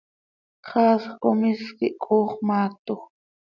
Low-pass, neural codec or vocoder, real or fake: 7.2 kHz; none; real